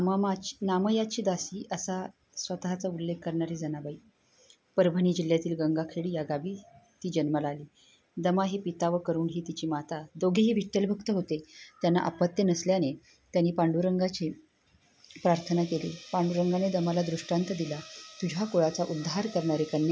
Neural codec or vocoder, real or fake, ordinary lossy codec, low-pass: none; real; none; none